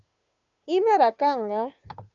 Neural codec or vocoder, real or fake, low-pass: codec, 16 kHz, 2 kbps, FunCodec, trained on Chinese and English, 25 frames a second; fake; 7.2 kHz